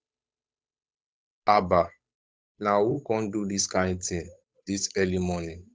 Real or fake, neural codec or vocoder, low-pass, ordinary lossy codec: fake; codec, 16 kHz, 8 kbps, FunCodec, trained on Chinese and English, 25 frames a second; none; none